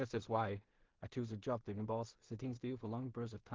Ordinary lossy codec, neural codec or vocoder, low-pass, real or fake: Opus, 16 kbps; codec, 16 kHz in and 24 kHz out, 0.4 kbps, LongCat-Audio-Codec, two codebook decoder; 7.2 kHz; fake